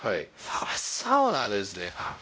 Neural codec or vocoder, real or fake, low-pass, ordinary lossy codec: codec, 16 kHz, 0.5 kbps, X-Codec, WavLM features, trained on Multilingual LibriSpeech; fake; none; none